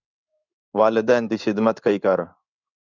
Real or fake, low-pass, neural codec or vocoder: fake; 7.2 kHz; codec, 16 kHz in and 24 kHz out, 1 kbps, XY-Tokenizer